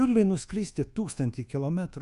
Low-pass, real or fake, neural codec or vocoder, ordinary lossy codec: 10.8 kHz; fake; codec, 24 kHz, 1.2 kbps, DualCodec; Opus, 64 kbps